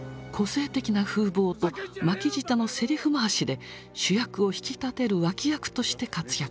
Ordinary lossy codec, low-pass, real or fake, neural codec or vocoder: none; none; real; none